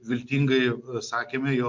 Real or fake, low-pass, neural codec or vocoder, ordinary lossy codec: real; 7.2 kHz; none; MP3, 48 kbps